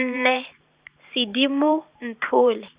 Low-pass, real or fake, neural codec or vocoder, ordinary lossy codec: 3.6 kHz; fake; vocoder, 44.1 kHz, 128 mel bands every 512 samples, BigVGAN v2; Opus, 64 kbps